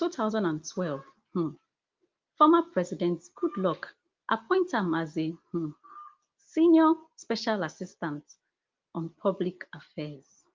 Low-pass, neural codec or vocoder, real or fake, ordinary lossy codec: 7.2 kHz; none; real; Opus, 32 kbps